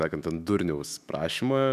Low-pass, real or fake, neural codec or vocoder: 14.4 kHz; fake; autoencoder, 48 kHz, 128 numbers a frame, DAC-VAE, trained on Japanese speech